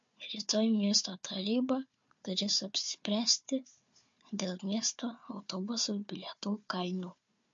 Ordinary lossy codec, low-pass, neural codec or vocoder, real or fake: MP3, 48 kbps; 7.2 kHz; codec, 16 kHz, 4 kbps, FunCodec, trained on Chinese and English, 50 frames a second; fake